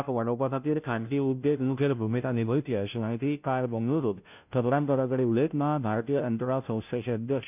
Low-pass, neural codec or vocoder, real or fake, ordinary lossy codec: 3.6 kHz; codec, 16 kHz, 0.5 kbps, FunCodec, trained on Chinese and English, 25 frames a second; fake; none